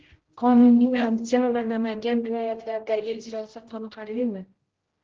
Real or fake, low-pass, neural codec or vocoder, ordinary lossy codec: fake; 7.2 kHz; codec, 16 kHz, 0.5 kbps, X-Codec, HuBERT features, trained on general audio; Opus, 16 kbps